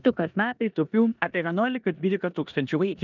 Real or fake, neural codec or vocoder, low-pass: fake; codec, 16 kHz in and 24 kHz out, 0.9 kbps, LongCat-Audio-Codec, four codebook decoder; 7.2 kHz